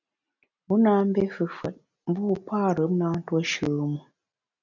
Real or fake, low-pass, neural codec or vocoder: real; 7.2 kHz; none